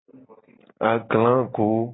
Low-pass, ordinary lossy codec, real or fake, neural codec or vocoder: 7.2 kHz; AAC, 16 kbps; real; none